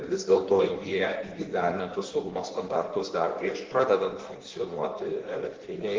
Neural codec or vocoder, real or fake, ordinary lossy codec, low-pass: codec, 16 kHz in and 24 kHz out, 1.1 kbps, FireRedTTS-2 codec; fake; Opus, 16 kbps; 7.2 kHz